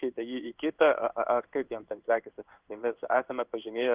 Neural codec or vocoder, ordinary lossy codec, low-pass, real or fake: codec, 16 kHz in and 24 kHz out, 1 kbps, XY-Tokenizer; Opus, 32 kbps; 3.6 kHz; fake